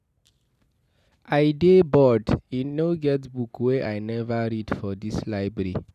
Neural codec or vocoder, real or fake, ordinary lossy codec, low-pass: none; real; none; 14.4 kHz